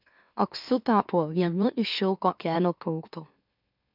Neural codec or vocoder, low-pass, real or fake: autoencoder, 44.1 kHz, a latent of 192 numbers a frame, MeloTTS; 5.4 kHz; fake